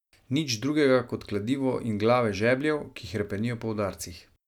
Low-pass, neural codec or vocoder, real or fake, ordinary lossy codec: 19.8 kHz; none; real; none